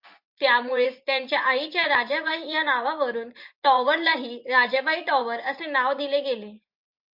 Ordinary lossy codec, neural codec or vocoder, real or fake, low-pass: MP3, 48 kbps; vocoder, 24 kHz, 100 mel bands, Vocos; fake; 5.4 kHz